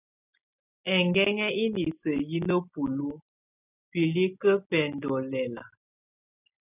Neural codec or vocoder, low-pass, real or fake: none; 3.6 kHz; real